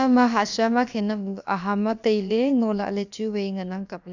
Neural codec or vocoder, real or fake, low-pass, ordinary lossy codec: codec, 16 kHz, about 1 kbps, DyCAST, with the encoder's durations; fake; 7.2 kHz; none